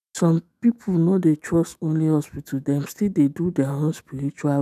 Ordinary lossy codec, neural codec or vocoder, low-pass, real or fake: none; autoencoder, 48 kHz, 128 numbers a frame, DAC-VAE, trained on Japanese speech; 14.4 kHz; fake